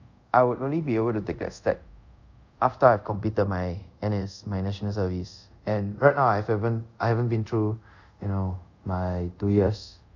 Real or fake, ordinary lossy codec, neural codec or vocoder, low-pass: fake; none; codec, 24 kHz, 0.5 kbps, DualCodec; 7.2 kHz